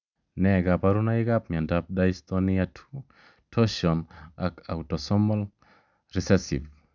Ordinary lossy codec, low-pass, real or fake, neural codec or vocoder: none; 7.2 kHz; real; none